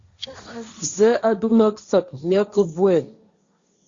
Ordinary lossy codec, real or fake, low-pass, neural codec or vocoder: Opus, 64 kbps; fake; 7.2 kHz; codec, 16 kHz, 1.1 kbps, Voila-Tokenizer